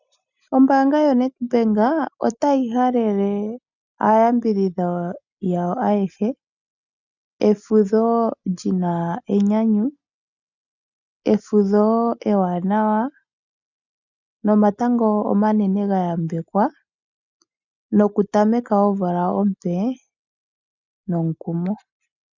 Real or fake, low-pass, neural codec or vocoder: real; 7.2 kHz; none